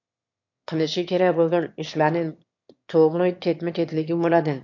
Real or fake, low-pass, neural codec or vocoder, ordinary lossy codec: fake; 7.2 kHz; autoencoder, 22.05 kHz, a latent of 192 numbers a frame, VITS, trained on one speaker; MP3, 48 kbps